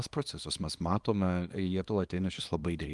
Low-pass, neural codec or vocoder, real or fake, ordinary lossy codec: 10.8 kHz; codec, 24 kHz, 0.9 kbps, WavTokenizer, small release; fake; Opus, 32 kbps